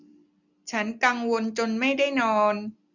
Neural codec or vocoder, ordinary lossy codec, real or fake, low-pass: none; none; real; 7.2 kHz